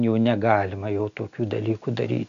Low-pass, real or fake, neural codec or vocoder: 7.2 kHz; real; none